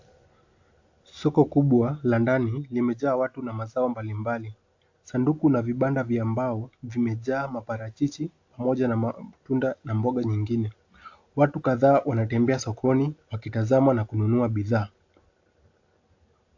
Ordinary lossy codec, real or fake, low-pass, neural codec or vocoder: AAC, 48 kbps; real; 7.2 kHz; none